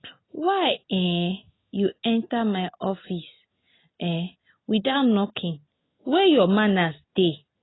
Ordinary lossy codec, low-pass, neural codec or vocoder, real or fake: AAC, 16 kbps; 7.2 kHz; none; real